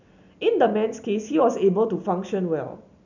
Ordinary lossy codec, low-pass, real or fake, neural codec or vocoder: none; 7.2 kHz; real; none